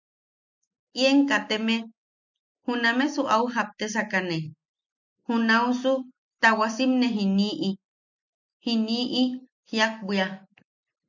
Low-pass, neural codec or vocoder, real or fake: 7.2 kHz; none; real